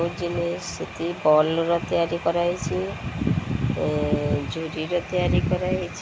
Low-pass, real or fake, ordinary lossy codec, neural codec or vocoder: none; real; none; none